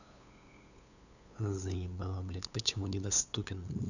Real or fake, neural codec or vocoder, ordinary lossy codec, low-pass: fake; codec, 16 kHz, 8 kbps, FunCodec, trained on LibriTTS, 25 frames a second; none; 7.2 kHz